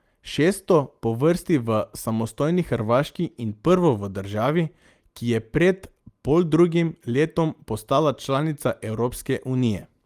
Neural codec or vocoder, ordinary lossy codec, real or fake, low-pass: none; Opus, 32 kbps; real; 14.4 kHz